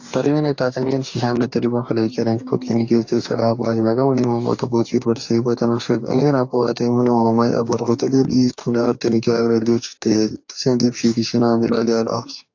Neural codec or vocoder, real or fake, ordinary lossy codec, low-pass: codec, 44.1 kHz, 2.6 kbps, DAC; fake; AAC, 48 kbps; 7.2 kHz